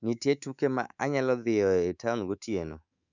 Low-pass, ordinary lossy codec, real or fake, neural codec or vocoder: 7.2 kHz; none; fake; codec, 24 kHz, 3.1 kbps, DualCodec